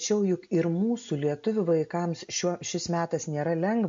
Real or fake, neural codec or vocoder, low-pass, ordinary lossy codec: real; none; 7.2 kHz; MP3, 48 kbps